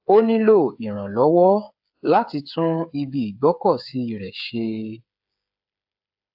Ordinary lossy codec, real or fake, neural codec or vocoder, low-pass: AAC, 48 kbps; fake; codec, 16 kHz, 8 kbps, FreqCodec, smaller model; 5.4 kHz